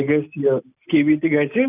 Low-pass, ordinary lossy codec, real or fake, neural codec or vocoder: 3.6 kHz; none; real; none